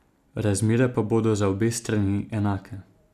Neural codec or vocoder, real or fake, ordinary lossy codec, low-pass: none; real; none; 14.4 kHz